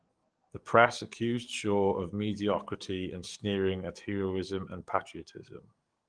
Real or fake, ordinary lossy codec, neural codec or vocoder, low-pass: fake; Opus, 24 kbps; codec, 44.1 kHz, 7.8 kbps, DAC; 9.9 kHz